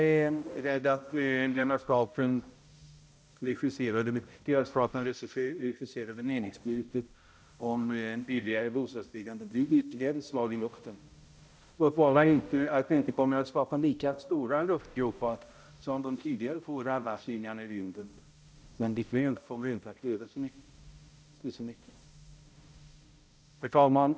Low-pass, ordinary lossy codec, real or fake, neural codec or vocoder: none; none; fake; codec, 16 kHz, 0.5 kbps, X-Codec, HuBERT features, trained on balanced general audio